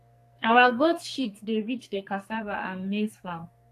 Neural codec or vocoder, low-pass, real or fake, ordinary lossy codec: codec, 44.1 kHz, 2.6 kbps, SNAC; 14.4 kHz; fake; AAC, 64 kbps